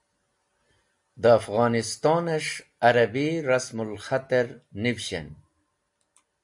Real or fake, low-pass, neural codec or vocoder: real; 10.8 kHz; none